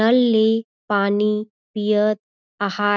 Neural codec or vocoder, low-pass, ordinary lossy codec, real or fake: none; 7.2 kHz; none; real